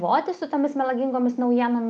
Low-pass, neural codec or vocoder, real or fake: 10.8 kHz; none; real